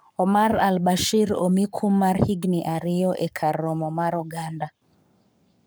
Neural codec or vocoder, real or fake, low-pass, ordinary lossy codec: codec, 44.1 kHz, 7.8 kbps, Pupu-Codec; fake; none; none